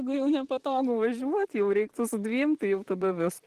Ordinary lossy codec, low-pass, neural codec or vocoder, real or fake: Opus, 16 kbps; 14.4 kHz; vocoder, 44.1 kHz, 128 mel bands, Pupu-Vocoder; fake